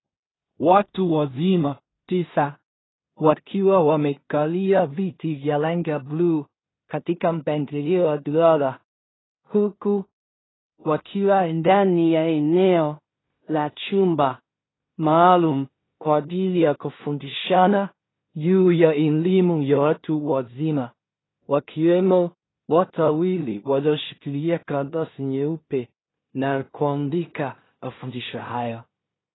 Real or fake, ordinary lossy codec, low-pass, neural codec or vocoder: fake; AAC, 16 kbps; 7.2 kHz; codec, 16 kHz in and 24 kHz out, 0.4 kbps, LongCat-Audio-Codec, two codebook decoder